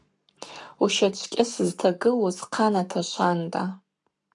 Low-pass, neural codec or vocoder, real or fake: 10.8 kHz; codec, 44.1 kHz, 7.8 kbps, Pupu-Codec; fake